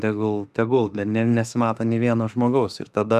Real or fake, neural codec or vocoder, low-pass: fake; autoencoder, 48 kHz, 32 numbers a frame, DAC-VAE, trained on Japanese speech; 14.4 kHz